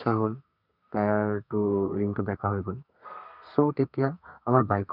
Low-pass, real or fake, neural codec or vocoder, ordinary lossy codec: 5.4 kHz; fake; codec, 32 kHz, 1.9 kbps, SNAC; none